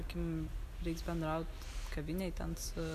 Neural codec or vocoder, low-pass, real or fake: none; 14.4 kHz; real